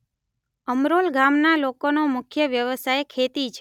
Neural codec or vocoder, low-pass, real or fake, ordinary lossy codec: none; 19.8 kHz; real; none